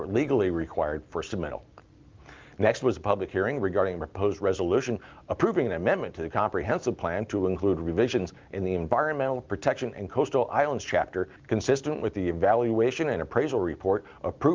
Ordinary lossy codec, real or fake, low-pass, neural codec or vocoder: Opus, 24 kbps; real; 7.2 kHz; none